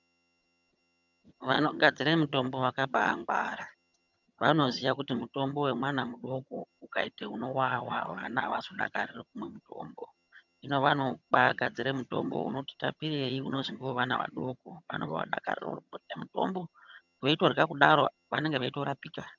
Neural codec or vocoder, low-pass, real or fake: vocoder, 22.05 kHz, 80 mel bands, HiFi-GAN; 7.2 kHz; fake